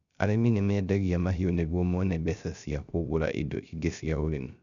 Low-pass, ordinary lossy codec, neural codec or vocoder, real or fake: 7.2 kHz; none; codec, 16 kHz, 0.3 kbps, FocalCodec; fake